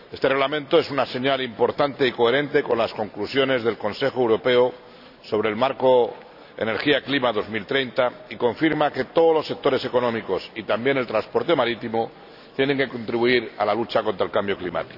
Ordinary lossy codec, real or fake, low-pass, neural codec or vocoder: none; real; 5.4 kHz; none